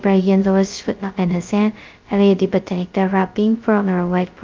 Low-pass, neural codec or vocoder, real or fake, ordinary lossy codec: 7.2 kHz; codec, 16 kHz, 0.2 kbps, FocalCodec; fake; Opus, 24 kbps